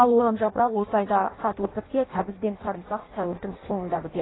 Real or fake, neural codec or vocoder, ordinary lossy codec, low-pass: fake; codec, 16 kHz in and 24 kHz out, 0.6 kbps, FireRedTTS-2 codec; AAC, 16 kbps; 7.2 kHz